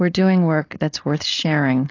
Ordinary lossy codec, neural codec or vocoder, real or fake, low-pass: AAC, 32 kbps; codec, 16 kHz, 4.8 kbps, FACodec; fake; 7.2 kHz